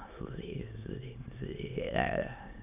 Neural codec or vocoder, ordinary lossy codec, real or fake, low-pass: autoencoder, 22.05 kHz, a latent of 192 numbers a frame, VITS, trained on many speakers; none; fake; 3.6 kHz